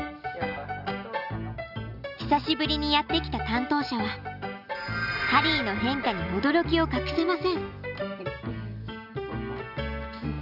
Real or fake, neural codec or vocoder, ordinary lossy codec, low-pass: real; none; none; 5.4 kHz